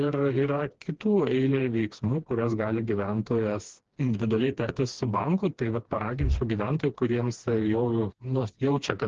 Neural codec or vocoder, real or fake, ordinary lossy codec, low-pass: codec, 16 kHz, 2 kbps, FreqCodec, smaller model; fake; Opus, 16 kbps; 7.2 kHz